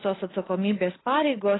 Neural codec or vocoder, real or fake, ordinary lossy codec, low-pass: none; real; AAC, 16 kbps; 7.2 kHz